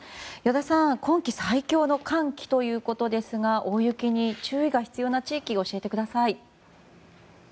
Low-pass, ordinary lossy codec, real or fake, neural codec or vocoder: none; none; real; none